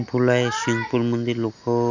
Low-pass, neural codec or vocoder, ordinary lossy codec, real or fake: 7.2 kHz; none; none; real